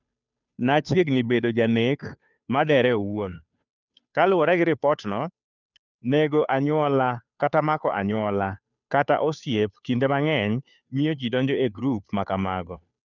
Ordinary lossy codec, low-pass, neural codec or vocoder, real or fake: none; 7.2 kHz; codec, 16 kHz, 2 kbps, FunCodec, trained on Chinese and English, 25 frames a second; fake